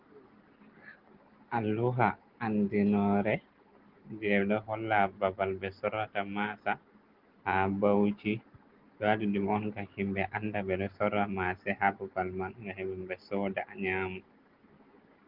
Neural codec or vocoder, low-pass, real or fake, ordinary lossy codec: none; 5.4 kHz; real; Opus, 16 kbps